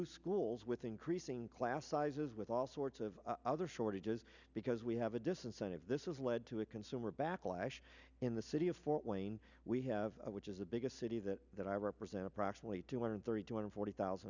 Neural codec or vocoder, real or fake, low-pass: none; real; 7.2 kHz